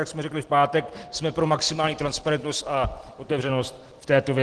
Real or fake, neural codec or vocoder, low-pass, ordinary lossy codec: real; none; 10.8 kHz; Opus, 16 kbps